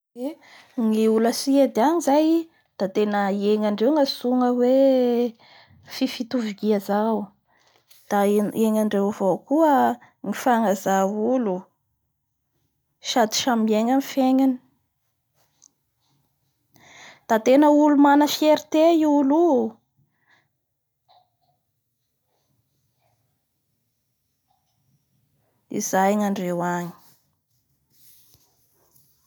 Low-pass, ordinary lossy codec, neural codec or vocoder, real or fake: none; none; none; real